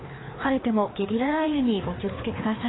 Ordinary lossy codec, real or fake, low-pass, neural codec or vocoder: AAC, 16 kbps; fake; 7.2 kHz; codec, 16 kHz, 2 kbps, FreqCodec, larger model